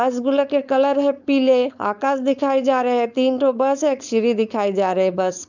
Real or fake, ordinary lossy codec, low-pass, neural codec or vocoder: fake; none; 7.2 kHz; codec, 16 kHz, 4.8 kbps, FACodec